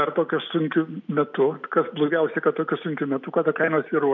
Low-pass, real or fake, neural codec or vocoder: 7.2 kHz; real; none